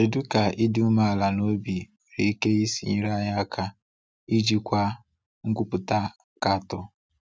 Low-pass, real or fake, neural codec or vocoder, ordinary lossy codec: none; real; none; none